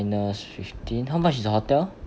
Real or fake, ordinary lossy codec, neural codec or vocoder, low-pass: real; none; none; none